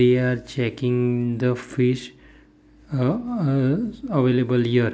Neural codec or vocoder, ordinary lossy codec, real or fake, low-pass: none; none; real; none